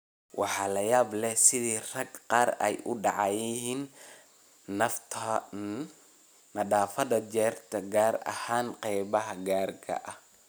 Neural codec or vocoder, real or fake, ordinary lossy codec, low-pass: none; real; none; none